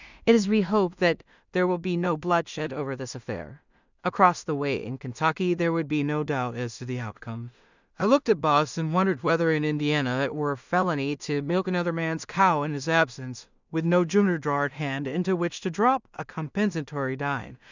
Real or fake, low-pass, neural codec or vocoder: fake; 7.2 kHz; codec, 16 kHz in and 24 kHz out, 0.4 kbps, LongCat-Audio-Codec, two codebook decoder